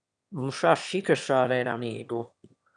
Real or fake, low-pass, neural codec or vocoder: fake; 9.9 kHz; autoencoder, 22.05 kHz, a latent of 192 numbers a frame, VITS, trained on one speaker